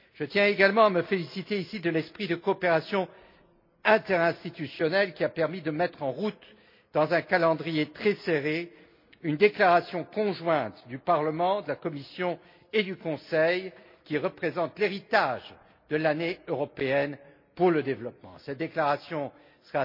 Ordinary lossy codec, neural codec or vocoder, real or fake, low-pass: MP3, 32 kbps; none; real; 5.4 kHz